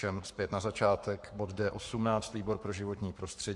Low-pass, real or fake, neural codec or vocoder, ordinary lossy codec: 10.8 kHz; fake; codec, 44.1 kHz, 7.8 kbps, Pupu-Codec; MP3, 64 kbps